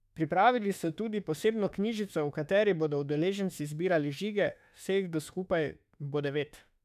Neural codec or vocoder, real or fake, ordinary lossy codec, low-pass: autoencoder, 48 kHz, 32 numbers a frame, DAC-VAE, trained on Japanese speech; fake; none; 14.4 kHz